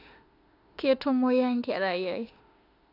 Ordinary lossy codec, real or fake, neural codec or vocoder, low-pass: AAC, 32 kbps; fake; autoencoder, 48 kHz, 32 numbers a frame, DAC-VAE, trained on Japanese speech; 5.4 kHz